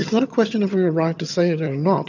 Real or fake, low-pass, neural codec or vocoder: fake; 7.2 kHz; vocoder, 22.05 kHz, 80 mel bands, HiFi-GAN